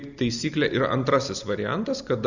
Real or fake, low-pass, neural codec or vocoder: real; 7.2 kHz; none